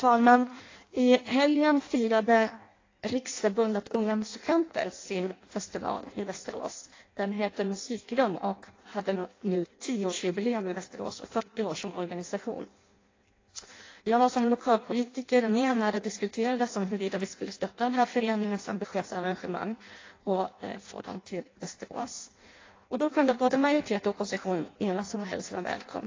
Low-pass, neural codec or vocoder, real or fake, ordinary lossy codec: 7.2 kHz; codec, 16 kHz in and 24 kHz out, 0.6 kbps, FireRedTTS-2 codec; fake; AAC, 32 kbps